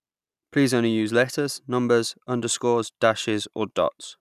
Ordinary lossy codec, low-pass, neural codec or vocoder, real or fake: none; 14.4 kHz; none; real